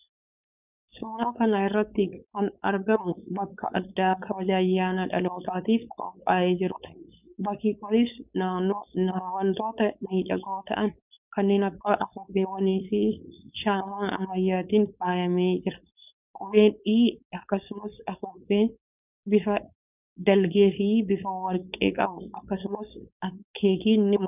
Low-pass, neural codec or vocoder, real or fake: 3.6 kHz; codec, 16 kHz, 4.8 kbps, FACodec; fake